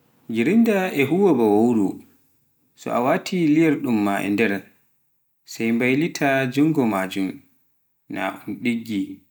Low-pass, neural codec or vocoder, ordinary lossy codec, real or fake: none; none; none; real